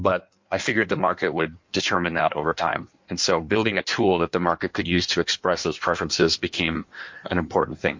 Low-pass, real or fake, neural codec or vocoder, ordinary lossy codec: 7.2 kHz; fake; codec, 16 kHz in and 24 kHz out, 1.1 kbps, FireRedTTS-2 codec; MP3, 48 kbps